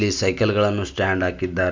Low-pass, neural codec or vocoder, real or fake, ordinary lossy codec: 7.2 kHz; none; real; MP3, 64 kbps